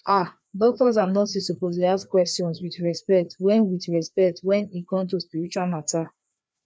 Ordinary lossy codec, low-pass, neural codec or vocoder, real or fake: none; none; codec, 16 kHz, 2 kbps, FreqCodec, larger model; fake